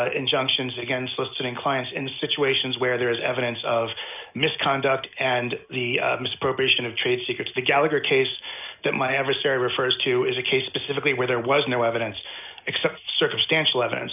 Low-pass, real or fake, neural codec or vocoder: 3.6 kHz; real; none